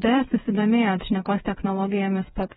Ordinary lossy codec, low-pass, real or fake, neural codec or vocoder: AAC, 16 kbps; 7.2 kHz; fake; codec, 16 kHz, 8 kbps, FreqCodec, smaller model